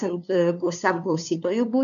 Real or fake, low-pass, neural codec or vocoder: fake; 7.2 kHz; codec, 16 kHz, 2 kbps, FunCodec, trained on LibriTTS, 25 frames a second